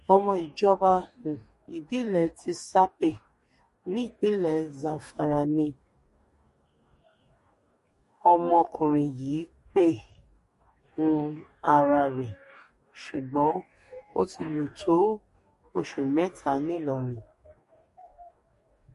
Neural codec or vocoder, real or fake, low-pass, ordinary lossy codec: codec, 44.1 kHz, 2.6 kbps, DAC; fake; 14.4 kHz; MP3, 48 kbps